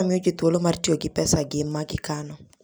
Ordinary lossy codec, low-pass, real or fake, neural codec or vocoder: none; none; real; none